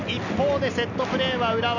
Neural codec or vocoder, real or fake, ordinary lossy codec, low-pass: none; real; none; 7.2 kHz